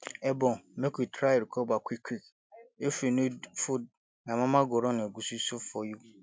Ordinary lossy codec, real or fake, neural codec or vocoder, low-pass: none; real; none; none